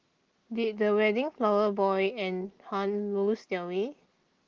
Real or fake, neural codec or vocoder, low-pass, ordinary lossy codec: real; none; 7.2 kHz; Opus, 16 kbps